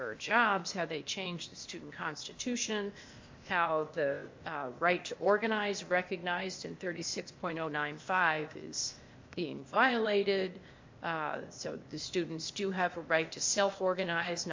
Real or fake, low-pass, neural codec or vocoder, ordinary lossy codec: fake; 7.2 kHz; codec, 16 kHz, 0.8 kbps, ZipCodec; MP3, 48 kbps